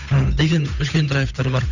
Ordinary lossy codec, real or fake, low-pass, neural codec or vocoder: none; fake; 7.2 kHz; codec, 16 kHz, 8 kbps, FunCodec, trained on Chinese and English, 25 frames a second